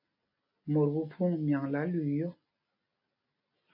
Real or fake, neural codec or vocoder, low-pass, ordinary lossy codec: real; none; 5.4 kHz; MP3, 32 kbps